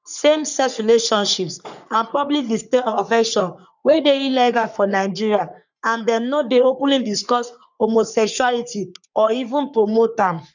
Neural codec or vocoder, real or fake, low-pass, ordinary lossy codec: codec, 44.1 kHz, 3.4 kbps, Pupu-Codec; fake; 7.2 kHz; none